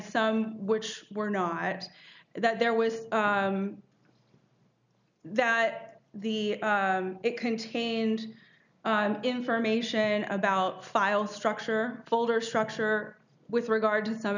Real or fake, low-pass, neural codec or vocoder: real; 7.2 kHz; none